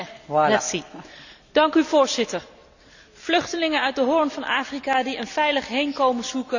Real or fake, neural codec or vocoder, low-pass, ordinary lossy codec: real; none; 7.2 kHz; none